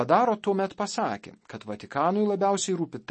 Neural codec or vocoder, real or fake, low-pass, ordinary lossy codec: none; real; 10.8 kHz; MP3, 32 kbps